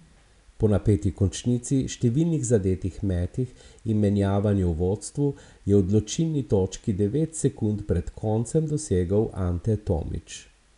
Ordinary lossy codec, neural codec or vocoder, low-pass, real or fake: none; none; 10.8 kHz; real